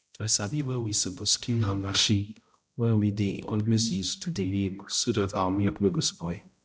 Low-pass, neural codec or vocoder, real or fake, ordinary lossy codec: none; codec, 16 kHz, 0.5 kbps, X-Codec, HuBERT features, trained on balanced general audio; fake; none